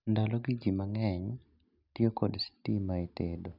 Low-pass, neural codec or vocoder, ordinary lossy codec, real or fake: 5.4 kHz; none; none; real